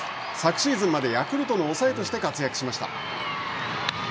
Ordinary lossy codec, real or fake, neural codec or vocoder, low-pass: none; real; none; none